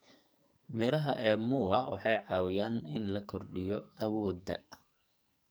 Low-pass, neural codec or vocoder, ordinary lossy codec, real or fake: none; codec, 44.1 kHz, 2.6 kbps, SNAC; none; fake